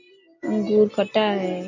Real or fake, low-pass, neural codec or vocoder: real; 7.2 kHz; none